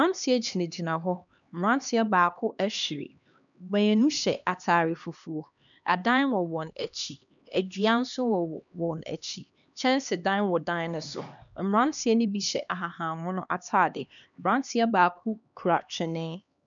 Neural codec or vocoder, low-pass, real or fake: codec, 16 kHz, 2 kbps, X-Codec, HuBERT features, trained on LibriSpeech; 7.2 kHz; fake